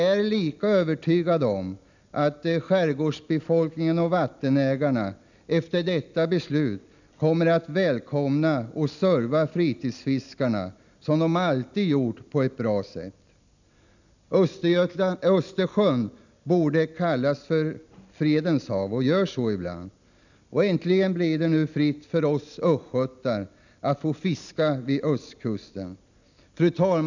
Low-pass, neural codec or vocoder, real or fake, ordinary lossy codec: 7.2 kHz; none; real; none